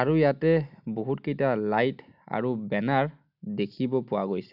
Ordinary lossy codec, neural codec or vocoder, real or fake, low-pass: none; none; real; 5.4 kHz